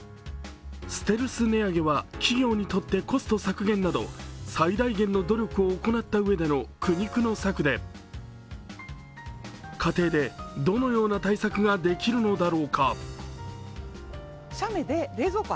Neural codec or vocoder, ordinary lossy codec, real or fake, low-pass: none; none; real; none